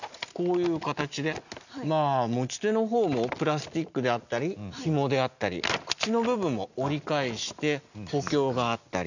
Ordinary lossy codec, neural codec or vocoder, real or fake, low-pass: none; none; real; 7.2 kHz